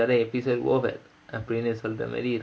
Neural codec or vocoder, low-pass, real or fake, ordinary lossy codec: none; none; real; none